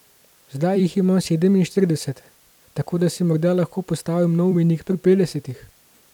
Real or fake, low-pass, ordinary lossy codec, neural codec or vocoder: fake; 19.8 kHz; none; vocoder, 44.1 kHz, 128 mel bands every 256 samples, BigVGAN v2